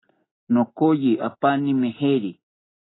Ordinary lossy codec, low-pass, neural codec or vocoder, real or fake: AAC, 16 kbps; 7.2 kHz; none; real